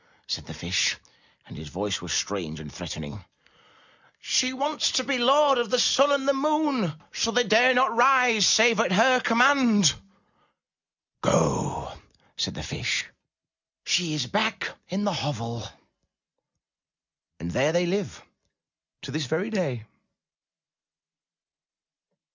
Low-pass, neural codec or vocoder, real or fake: 7.2 kHz; none; real